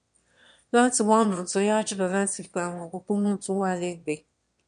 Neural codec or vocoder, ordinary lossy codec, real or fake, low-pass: autoencoder, 22.05 kHz, a latent of 192 numbers a frame, VITS, trained on one speaker; MP3, 64 kbps; fake; 9.9 kHz